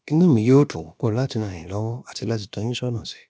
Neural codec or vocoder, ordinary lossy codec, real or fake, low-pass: codec, 16 kHz, about 1 kbps, DyCAST, with the encoder's durations; none; fake; none